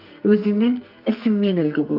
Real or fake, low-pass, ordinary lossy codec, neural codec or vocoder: fake; 5.4 kHz; Opus, 24 kbps; codec, 44.1 kHz, 2.6 kbps, SNAC